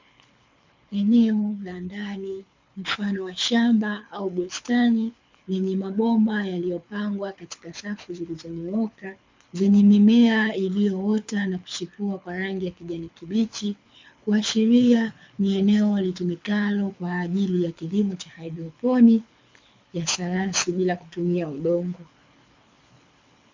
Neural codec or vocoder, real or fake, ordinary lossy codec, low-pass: codec, 24 kHz, 6 kbps, HILCodec; fake; MP3, 64 kbps; 7.2 kHz